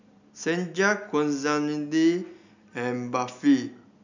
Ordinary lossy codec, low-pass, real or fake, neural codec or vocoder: none; 7.2 kHz; real; none